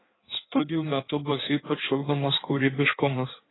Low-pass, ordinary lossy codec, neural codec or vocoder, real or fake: 7.2 kHz; AAC, 16 kbps; codec, 16 kHz in and 24 kHz out, 1.1 kbps, FireRedTTS-2 codec; fake